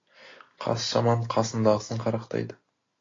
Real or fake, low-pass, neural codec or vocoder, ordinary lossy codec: real; 7.2 kHz; none; AAC, 32 kbps